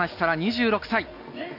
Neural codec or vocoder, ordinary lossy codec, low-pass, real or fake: none; none; 5.4 kHz; real